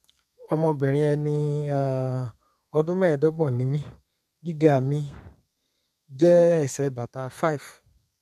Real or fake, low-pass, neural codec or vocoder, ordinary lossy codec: fake; 14.4 kHz; codec, 32 kHz, 1.9 kbps, SNAC; none